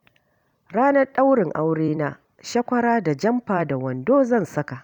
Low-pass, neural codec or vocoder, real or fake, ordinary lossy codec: 19.8 kHz; vocoder, 44.1 kHz, 128 mel bands every 256 samples, BigVGAN v2; fake; none